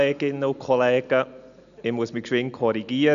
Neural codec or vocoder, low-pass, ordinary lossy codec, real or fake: none; 7.2 kHz; none; real